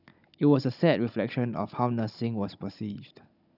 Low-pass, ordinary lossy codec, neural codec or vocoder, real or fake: 5.4 kHz; none; codec, 16 kHz, 16 kbps, FunCodec, trained on Chinese and English, 50 frames a second; fake